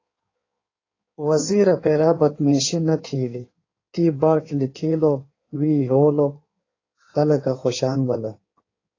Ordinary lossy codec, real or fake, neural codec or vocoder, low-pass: AAC, 32 kbps; fake; codec, 16 kHz in and 24 kHz out, 1.1 kbps, FireRedTTS-2 codec; 7.2 kHz